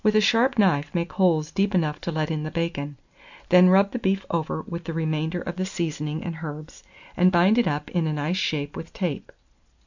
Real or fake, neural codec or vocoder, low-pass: real; none; 7.2 kHz